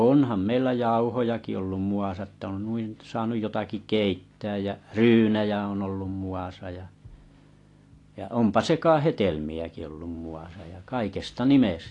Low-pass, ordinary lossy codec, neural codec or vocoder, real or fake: 10.8 kHz; AAC, 48 kbps; none; real